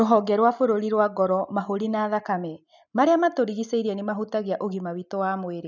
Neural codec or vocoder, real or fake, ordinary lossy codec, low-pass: none; real; none; 7.2 kHz